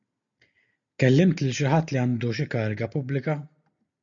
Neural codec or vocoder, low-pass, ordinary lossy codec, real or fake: none; 7.2 kHz; MP3, 96 kbps; real